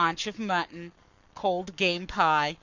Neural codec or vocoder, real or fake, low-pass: codec, 44.1 kHz, 7.8 kbps, Pupu-Codec; fake; 7.2 kHz